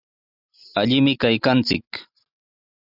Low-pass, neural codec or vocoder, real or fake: 5.4 kHz; none; real